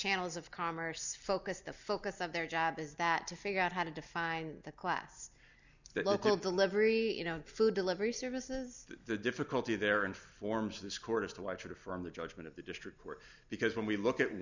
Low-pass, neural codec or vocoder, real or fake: 7.2 kHz; none; real